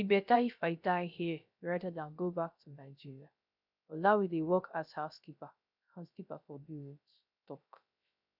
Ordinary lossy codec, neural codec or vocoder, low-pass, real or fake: none; codec, 16 kHz, 0.3 kbps, FocalCodec; 5.4 kHz; fake